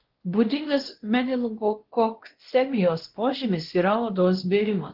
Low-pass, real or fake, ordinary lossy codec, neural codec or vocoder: 5.4 kHz; fake; Opus, 16 kbps; codec, 16 kHz, 1 kbps, X-Codec, WavLM features, trained on Multilingual LibriSpeech